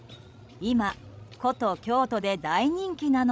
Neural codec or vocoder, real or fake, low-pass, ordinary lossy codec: codec, 16 kHz, 16 kbps, FreqCodec, larger model; fake; none; none